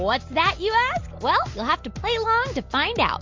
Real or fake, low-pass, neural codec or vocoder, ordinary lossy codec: real; 7.2 kHz; none; MP3, 48 kbps